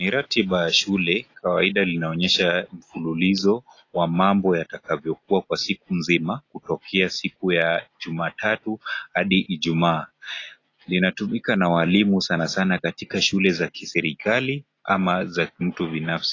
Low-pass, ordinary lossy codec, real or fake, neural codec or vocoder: 7.2 kHz; AAC, 32 kbps; real; none